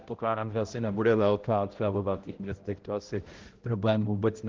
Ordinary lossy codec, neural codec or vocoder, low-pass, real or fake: Opus, 16 kbps; codec, 16 kHz, 0.5 kbps, X-Codec, HuBERT features, trained on balanced general audio; 7.2 kHz; fake